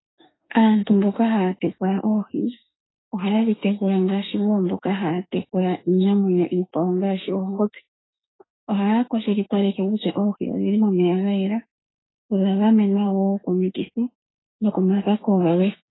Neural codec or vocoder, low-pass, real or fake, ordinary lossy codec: autoencoder, 48 kHz, 32 numbers a frame, DAC-VAE, trained on Japanese speech; 7.2 kHz; fake; AAC, 16 kbps